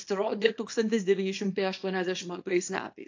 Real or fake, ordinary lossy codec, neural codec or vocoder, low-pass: fake; AAC, 48 kbps; codec, 24 kHz, 0.9 kbps, WavTokenizer, small release; 7.2 kHz